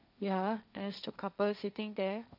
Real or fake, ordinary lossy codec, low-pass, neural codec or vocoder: fake; none; 5.4 kHz; codec, 16 kHz, 1.1 kbps, Voila-Tokenizer